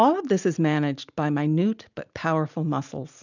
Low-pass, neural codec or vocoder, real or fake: 7.2 kHz; none; real